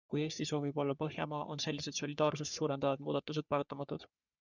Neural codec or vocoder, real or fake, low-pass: codec, 44.1 kHz, 3.4 kbps, Pupu-Codec; fake; 7.2 kHz